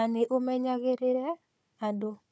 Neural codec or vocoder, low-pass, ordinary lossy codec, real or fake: codec, 16 kHz, 4 kbps, FunCodec, trained on Chinese and English, 50 frames a second; none; none; fake